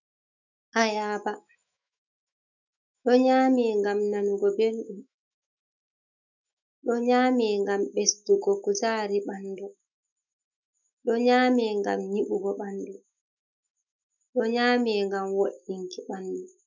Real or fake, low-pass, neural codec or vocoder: fake; 7.2 kHz; autoencoder, 48 kHz, 128 numbers a frame, DAC-VAE, trained on Japanese speech